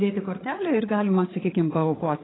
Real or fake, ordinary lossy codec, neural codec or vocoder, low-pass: fake; AAC, 16 kbps; codec, 16 kHz, 8 kbps, FreqCodec, larger model; 7.2 kHz